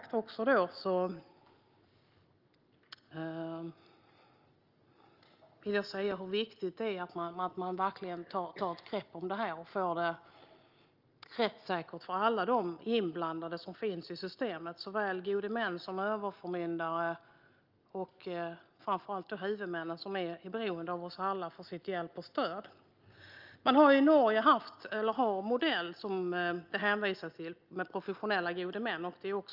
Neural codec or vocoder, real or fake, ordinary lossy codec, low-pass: none; real; Opus, 24 kbps; 5.4 kHz